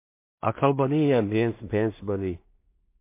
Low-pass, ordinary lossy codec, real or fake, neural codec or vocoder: 3.6 kHz; MP3, 24 kbps; fake; codec, 16 kHz in and 24 kHz out, 0.4 kbps, LongCat-Audio-Codec, two codebook decoder